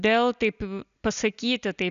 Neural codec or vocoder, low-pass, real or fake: none; 7.2 kHz; real